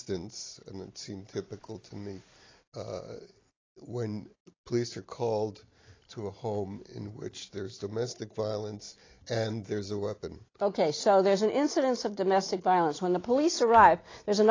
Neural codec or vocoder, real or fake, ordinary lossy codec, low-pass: none; real; AAC, 32 kbps; 7.2 kHz